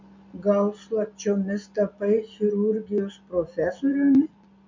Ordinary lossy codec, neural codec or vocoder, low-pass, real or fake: MP3, 64 kbps; none; 7.2 kHz; real